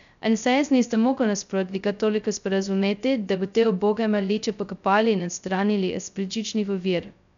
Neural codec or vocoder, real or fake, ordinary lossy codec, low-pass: codec, 16 kHz, 0.2 kbps, FocalCodec; fake; none; 7.2 kHz